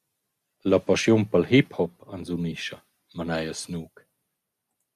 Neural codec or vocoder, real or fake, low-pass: none; real; 14.4 kHz